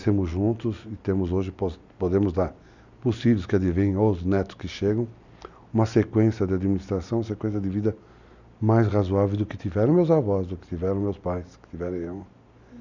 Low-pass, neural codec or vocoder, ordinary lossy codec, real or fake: 7.2 kHz; none; none; real